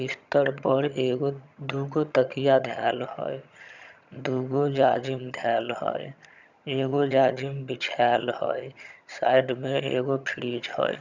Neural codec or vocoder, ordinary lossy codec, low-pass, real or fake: vocoder, 22.05 kHz, 80 mel bands, HiFi-GAN; none; 7.2 kHz; fake